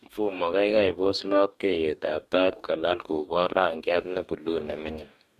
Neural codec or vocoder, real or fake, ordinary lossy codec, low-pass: codec, 44.1 kHz, 2.6 kbps, DAC; fake; Opus, 64 kbps; 14.4 kHz